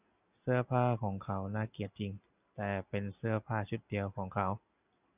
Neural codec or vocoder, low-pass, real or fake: none; 3.6 kHz; real